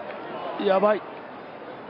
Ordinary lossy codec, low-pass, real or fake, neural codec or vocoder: none; 5.4 kHz; real; none